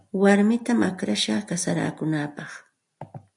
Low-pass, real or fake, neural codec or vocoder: 10.8 kHz; real; none